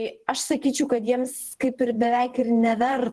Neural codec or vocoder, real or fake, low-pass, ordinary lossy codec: vocoder, 48 kHz, 128 mel bands, Vocos; fake; 10.8 kHz; Opus, 16 kbps